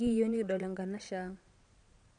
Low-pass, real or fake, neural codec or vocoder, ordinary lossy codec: 9.9 kHz; fake; vocoder, 22.05 kHz, 80 mel bands, Vocos; none